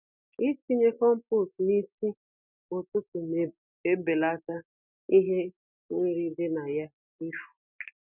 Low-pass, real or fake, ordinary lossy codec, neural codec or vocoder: 3.6 kHz; real; none; none